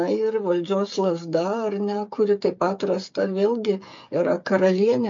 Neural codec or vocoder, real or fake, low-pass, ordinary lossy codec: codec, 16 kHz, 8 kbps, FreqCodec, smaller model; fake; 7.2 kHz; MP3, 48 kbps